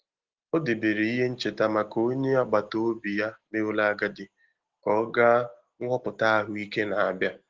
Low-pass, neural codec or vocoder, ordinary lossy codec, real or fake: 7.2 kHz; none; Opus, 16 kbps; real